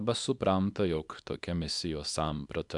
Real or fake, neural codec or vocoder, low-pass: fake; codec, 24 kHz, 0.9 kbps, WavTokenizer, small release; 10.8 kHz